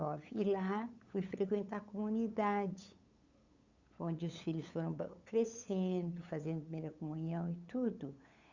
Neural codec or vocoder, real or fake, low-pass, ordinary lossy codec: codec, 16 kHz, 8 kbps, FunCodec, trained on LibriTTS, 25 frames a second; fake; 7.2 kHz; Opus, 64 kbps